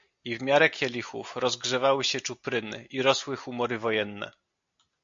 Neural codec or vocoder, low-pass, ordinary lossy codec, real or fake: none; 7.2 kHz; MP3, 48 kbps; real